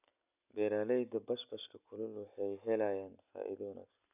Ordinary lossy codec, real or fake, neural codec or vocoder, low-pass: none; real; none; 3.6 kHz